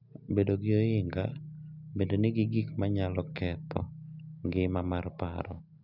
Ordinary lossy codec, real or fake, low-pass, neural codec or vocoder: none; real; 5.4 kHz; none